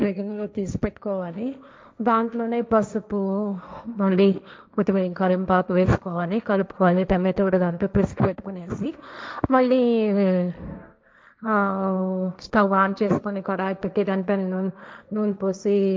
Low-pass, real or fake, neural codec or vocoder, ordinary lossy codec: none; fake; codec, 16 kHz, 1.1 kbps, Voila-Tokenizer; none